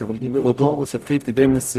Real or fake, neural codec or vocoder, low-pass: fake; codec, 44.1 kHz, 0.9 kbps, DAC; 14.4 kHz